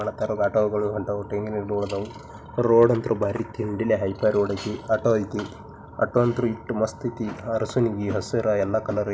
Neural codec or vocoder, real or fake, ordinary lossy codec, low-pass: none; real; none; none